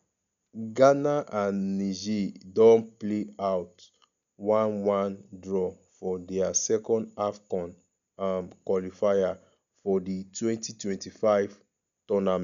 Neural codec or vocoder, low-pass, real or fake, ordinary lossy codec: none; 7.2 kHz; real; none